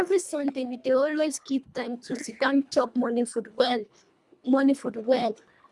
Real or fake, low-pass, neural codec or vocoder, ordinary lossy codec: fake; none; codec, 24 kHz, 1.5 kbps, HILCodec; none